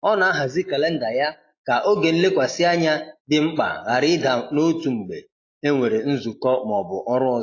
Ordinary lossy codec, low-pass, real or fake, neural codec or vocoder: AAC, 32 kbps; 7.2 kHz; real; none